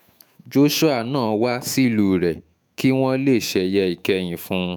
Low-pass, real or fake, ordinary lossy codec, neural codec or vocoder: none; fake; none; autoencoder, 48 kHz, 128 numbers a frame, DAC-VAE, trained on Japanese speech